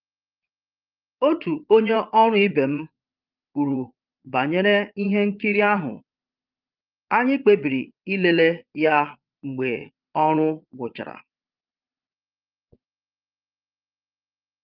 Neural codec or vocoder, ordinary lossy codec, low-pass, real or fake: vocoder, 22.05 kHz, 80 mel bands, Vocos; Opus, 24 kbps; 5.4 kHz; fake